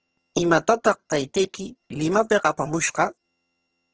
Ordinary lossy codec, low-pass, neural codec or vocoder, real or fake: Opus, 16 kbps; 7.2 kHz; vocoder, 22.05 kHz, 80 mel bands, HiFi-GAN; fake